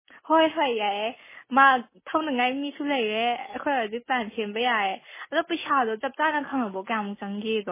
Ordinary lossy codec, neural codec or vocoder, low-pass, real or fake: MP3, 16 kbps; none; 3.6 kHz; real